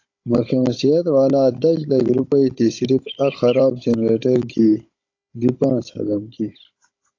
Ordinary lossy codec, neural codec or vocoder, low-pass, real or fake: AAC, 48 kbps; codec, 16 kHz, 16 kbps, FunCodec, trained on Chinese and English, 50 frames a second; 7.2 kHz; fake